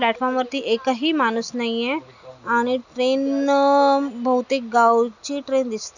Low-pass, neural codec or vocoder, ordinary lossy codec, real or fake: 7.2 kHz; none; none; real